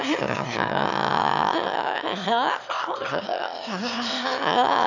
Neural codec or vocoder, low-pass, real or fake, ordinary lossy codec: autoencoder, 22.05 kHz, a latent of 192 numbers a frame, VITS, trained on one speaker; 7.2 kHz; fake; none